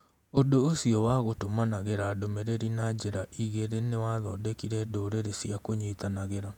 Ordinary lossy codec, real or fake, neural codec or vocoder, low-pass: none; fake; vocoder, 48 kHz, 128 mel bands, Vocos; 19.8 kHz